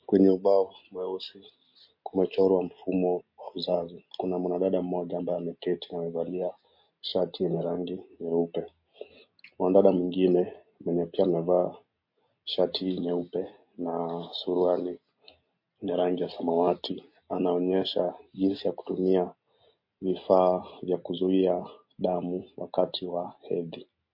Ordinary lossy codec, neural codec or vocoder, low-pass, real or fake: MP3, 32 kbps; none; 5.4 kHz; real